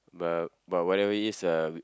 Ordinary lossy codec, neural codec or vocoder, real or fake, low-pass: none; none; real; none